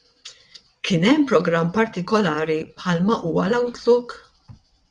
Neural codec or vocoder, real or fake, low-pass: vocoder, 22.05 kHz, 80 mel bands, WaveNeXt; fake; 9.9 kHz